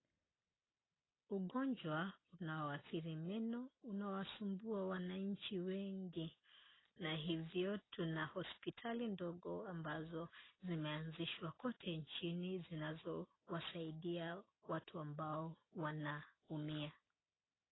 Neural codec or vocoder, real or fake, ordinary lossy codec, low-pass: none; real; AAC, 16 kbps; 7.2 kHz